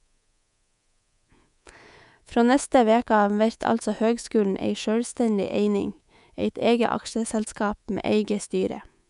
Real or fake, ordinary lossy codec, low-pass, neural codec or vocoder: fake; none; 10.8 kHz; codec, 24 kHz, 3.1 kbps, DualCodec